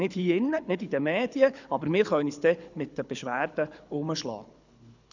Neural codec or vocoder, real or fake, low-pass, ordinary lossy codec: codec, 24 kHz, 6 kbps, HILCodec; fake; 7.2 kHz; none